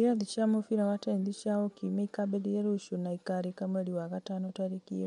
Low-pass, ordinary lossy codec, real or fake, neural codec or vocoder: 10.8 kHz; MP3, 64 kbps; real; none